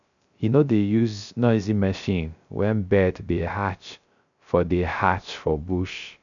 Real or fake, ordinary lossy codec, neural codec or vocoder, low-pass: fake; none; codec, 16 kHz, 0.3 kbps, FocalCodec; 7.2 kHz